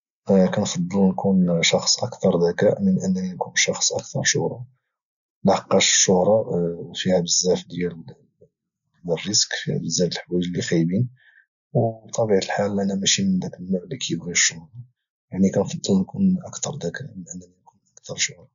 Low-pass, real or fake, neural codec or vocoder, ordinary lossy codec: 7.2 kHz; real; none; none